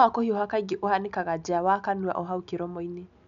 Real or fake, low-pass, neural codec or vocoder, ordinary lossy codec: real; 7.2 kHz; none; none